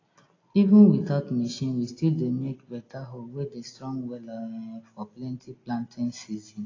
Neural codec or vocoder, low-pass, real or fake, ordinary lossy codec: none; 7.2 kHz; real; AAC, 32 kbps